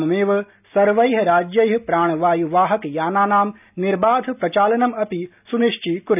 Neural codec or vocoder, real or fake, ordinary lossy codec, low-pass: none; real; none; 3.6 kHz